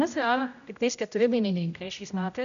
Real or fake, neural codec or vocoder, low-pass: fake; codec, 16 kHz, 0.5 kbps, X-Codec, HuBERT features, trained on general audio; 7.2 kHz